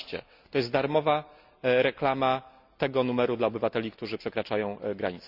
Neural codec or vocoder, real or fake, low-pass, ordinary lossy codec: none; real; 5.4 kHz; Opus, 64 kbps